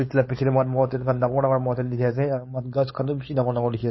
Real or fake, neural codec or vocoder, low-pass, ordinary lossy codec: fake; codec, 16 kHz, 4.8 kbps, FACodec; 7.2 kHz; MP3, 24 kbps